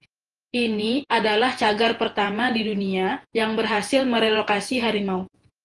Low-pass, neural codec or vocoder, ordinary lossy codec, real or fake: 10.8 kHz; vocoder, 48 kHz, 128 mel bands, Vocos; Opus, 24 kbps; fake